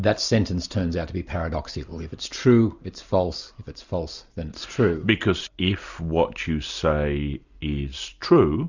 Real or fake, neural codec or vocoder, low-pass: real; none; 7.2 kHz